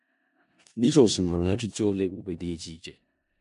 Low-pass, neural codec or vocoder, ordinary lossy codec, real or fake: 10.8 kHz; codec, 16 kHz in and 24 kHz out, 0.4 kbps, LongCat-Audio-Codec, four codebook decoder; MP3, 64 kbps; fake